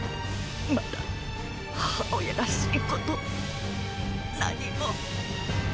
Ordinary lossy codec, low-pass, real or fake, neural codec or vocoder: none; none; real; none